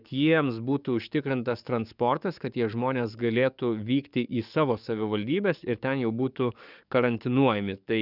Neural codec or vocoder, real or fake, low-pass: codec, 44.1 kHz, 7.8 kbps, DAC; fake; 5.4 kHz